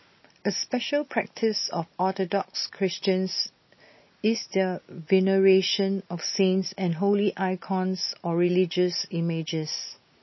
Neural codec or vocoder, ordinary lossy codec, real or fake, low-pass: none; MP3, 24 kbps; real; 7.2 kHz